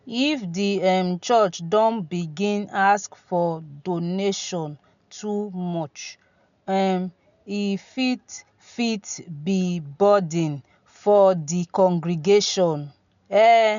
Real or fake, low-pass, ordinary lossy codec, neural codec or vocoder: real; 7.2 kHz; none; none